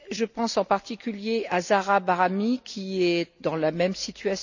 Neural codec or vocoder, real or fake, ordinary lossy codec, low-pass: none; real; none; 7.2 kHz